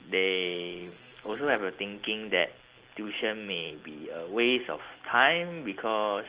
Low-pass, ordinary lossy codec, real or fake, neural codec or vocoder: 3.6 kHz; Opus, 16 kbps; real; none